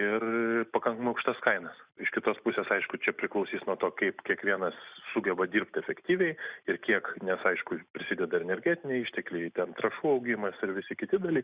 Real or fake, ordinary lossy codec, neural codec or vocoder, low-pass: real; Opus, 64 kbps; none; 3.6 kHz